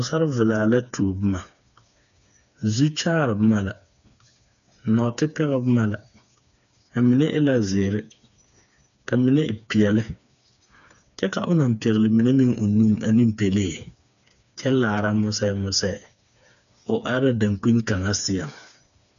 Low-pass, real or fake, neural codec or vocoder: 7.2 kHz; fake; codec, 16 kHz, 4 kbps, FreqCodec, smaller model